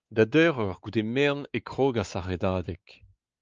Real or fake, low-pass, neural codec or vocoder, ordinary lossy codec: fake; 7.2 kHz; codec, 16 kHz, 4 kbps, X-Codec, WavLM features, trained on Multilingual LibriSpeech; Opus, 24 kbps